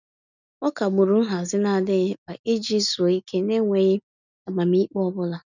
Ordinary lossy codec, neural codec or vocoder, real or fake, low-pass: none; none; real; 7.2 kHz